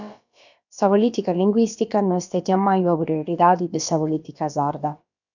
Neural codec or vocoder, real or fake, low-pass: codec, 16 kHz, about 1 kbps, DyCAST, with the encoder's durations; fake; 7.2 kHz